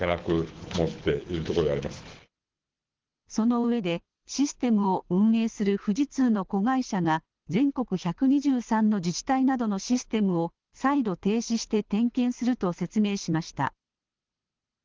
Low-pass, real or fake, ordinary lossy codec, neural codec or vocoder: 7.2 kHz; fake; Opus, 16 kbps; codec, 24 kHz, 6 kbps, HILCodec